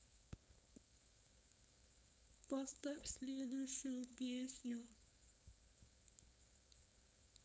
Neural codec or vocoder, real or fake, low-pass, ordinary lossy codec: codec, 16 kHz, 4.8 kbps, FACodec; fake; none; none